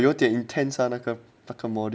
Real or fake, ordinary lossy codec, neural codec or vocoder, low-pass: real; none; none; none